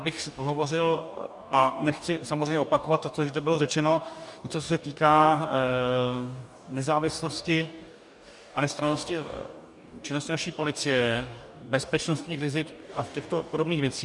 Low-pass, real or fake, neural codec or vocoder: 10.8 kHz; fake; codec, 44.1 kHz, 2.6 kbps, DAC